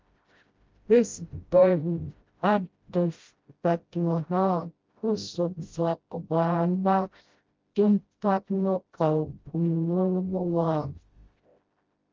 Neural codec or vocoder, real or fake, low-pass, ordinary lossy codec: codec, 16 kHz, 0.5 kbps, FreqCodec, smaller model; fake; 7.2 kHz; Opus, 32 kbps